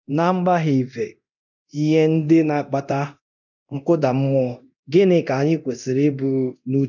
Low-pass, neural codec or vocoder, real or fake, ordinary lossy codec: 7.2 kHz; codec, 24 kHz, 0.9 kbps, DualCodec; fake; none